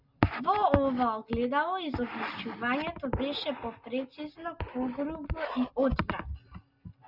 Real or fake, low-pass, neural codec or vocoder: real; 5.4 kHz; none